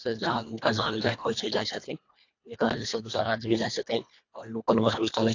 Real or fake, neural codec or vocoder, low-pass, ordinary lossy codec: fake; codec, 24 kHz, 1.5 kbps, HILCodec; 7.2 kHz; AAC, 48 kbps